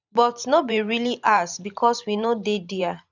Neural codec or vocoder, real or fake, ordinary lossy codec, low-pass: vocoder, 44.1 kHz, 128 mel bands every 512 samples, BigVGAN v2; fake; none; 7.2 kHz